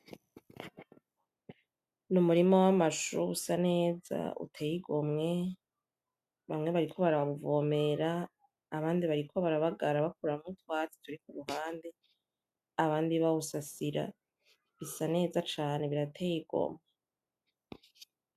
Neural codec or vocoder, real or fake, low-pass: none; real; 14.4 kHz